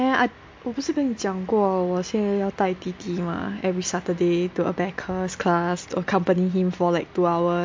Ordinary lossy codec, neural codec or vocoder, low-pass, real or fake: MP3, 48 kbps; none; 7.2 kHz; real